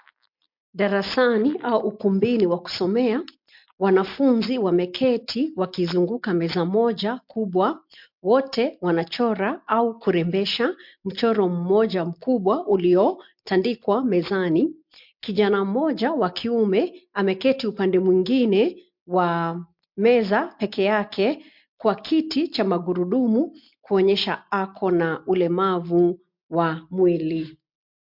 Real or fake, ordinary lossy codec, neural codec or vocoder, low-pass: real; MP3, 48 kbps; none; 5.4 kHz